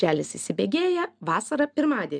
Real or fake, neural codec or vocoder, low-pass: real; none; 9.9 kHz